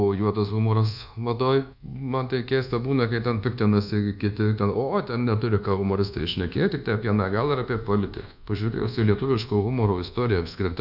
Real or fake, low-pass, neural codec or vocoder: fake; 5.4 kHz; codec, 24 kHz, 1.2 kbps, DualCodec